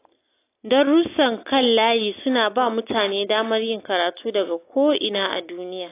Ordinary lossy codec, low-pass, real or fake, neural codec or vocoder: AAC, 24 kbps; 3.6 kHz; real; none